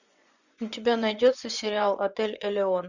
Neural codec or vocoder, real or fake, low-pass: vocoder, 24 kHz, 100 mel bands, Vocos; fake; 7.2 kHz